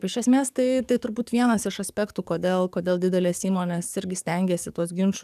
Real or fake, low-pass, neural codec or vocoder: fake; 14.4 kHz; codec, 44.1 kHz, 7.8 kbps, DAC